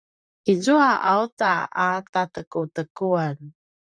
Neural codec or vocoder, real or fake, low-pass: vocoder, 44.1 kHz, 128 mel bands, Pupu-Vocoder; fake; 9.9 kHz